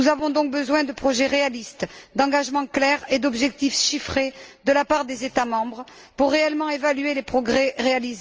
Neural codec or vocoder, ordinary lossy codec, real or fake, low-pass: none; Opus, 24 kbps; real; 7.2 kHz